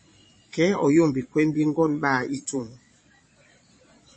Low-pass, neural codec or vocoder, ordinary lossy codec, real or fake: 9.9 kHz; vocoder, 22.05 kHz, 80 mel bands, Vocos; MP3, 32 kbps; fake